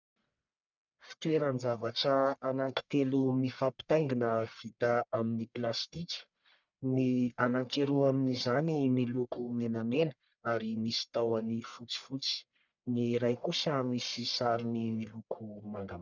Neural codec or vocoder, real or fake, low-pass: codec, 44.1 kHz, 1.7 kbps, Pupu-Codec; fake; 7.2 kHz